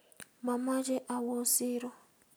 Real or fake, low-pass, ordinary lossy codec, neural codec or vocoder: real; none; none; none